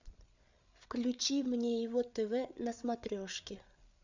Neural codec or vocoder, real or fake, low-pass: codec, 16 kHz, 8 kbps, FreqCodec, larger model; fake; 7.2 kHz